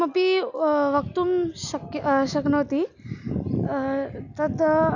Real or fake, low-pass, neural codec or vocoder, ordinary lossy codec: real; 7.2 kHz; none; none